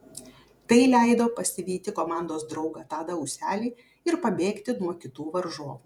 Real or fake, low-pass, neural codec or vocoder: fake; 19.8 kHz; vocoder, 48 kHz, 128 mel bands, Vocos